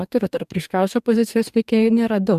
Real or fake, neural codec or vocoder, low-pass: fake; codec, 44.1 kHz, 2.6 kbps, DAC; 14.4 kHz